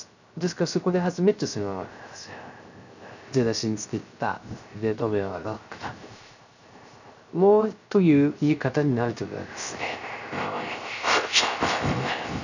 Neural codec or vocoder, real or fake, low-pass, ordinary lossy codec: codec, 16 kHz, 0.3 kbps, FocalCodec; fake; 7.2 kHz; none